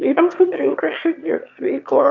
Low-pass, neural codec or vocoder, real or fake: 7.2 kHz; autoencoder, 22.05 kHz, a latent of 192 numbers a frame, VITS, trained on one speaker; fake